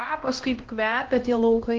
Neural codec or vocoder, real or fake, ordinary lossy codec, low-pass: codec, 16 kHz, 1 kbps, X-Codec, HuBERT features, trained on LibriSpeech; fake; Opus, 16 kbps; 7.2 kHz